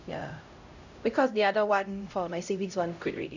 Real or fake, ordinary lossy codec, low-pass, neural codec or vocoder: fake; none; 7.2 kHz; codec, 16 kHz, 0.5 kbps, X-Codec, HuBERT features, trained on LibriSpeech